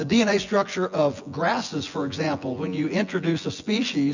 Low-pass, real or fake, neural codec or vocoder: 7.2 kHz; fake; vocoder, 24 kHz, 100 mel bands, Vocos